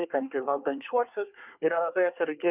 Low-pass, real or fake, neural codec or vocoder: 3.6 kHz; fake; codec, 24 kHz, 1 kbps, SNAC